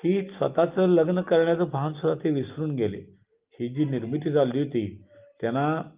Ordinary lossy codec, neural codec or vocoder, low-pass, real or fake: Opus, 32 kbps; none; 3.6 kHz; real